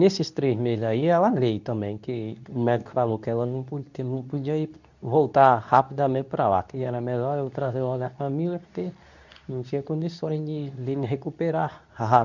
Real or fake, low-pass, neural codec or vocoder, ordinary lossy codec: fake; 7.2 kHz; codec, 24 kHz, 0.9 kbps, WavTokenizer, medium speech release version 2; none